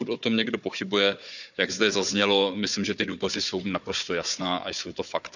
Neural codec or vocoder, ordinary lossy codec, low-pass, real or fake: codec, 16 kHz, 4 kbps, FunCodec, trained on Chinese and English, 50 frames a second; none; 7.2 kHz; fake